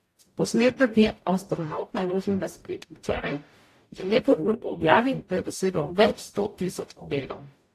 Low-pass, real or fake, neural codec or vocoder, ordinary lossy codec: 14.4 kHz; fake; codec, 44.1 kHz, 0.9 kbps, DAC; AAC, 64 kbps